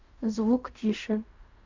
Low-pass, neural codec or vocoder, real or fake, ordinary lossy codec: 7.2 kHz; codec, 16 kHz in and 24 kHz out, 0.4 kbps, LongCat-Audio-Codec, fine tuned four codebook decoder; fake; MP3, 48 kbps